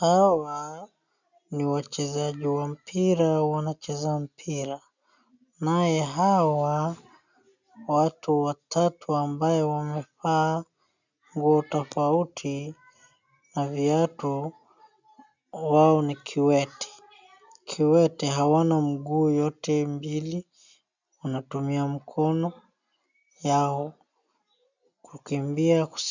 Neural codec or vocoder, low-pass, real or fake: none; 7.2 kHz; real